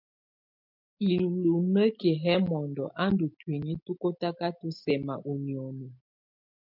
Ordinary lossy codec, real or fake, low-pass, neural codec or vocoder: MP3, 48 kbps; real; 5.4 kHz; none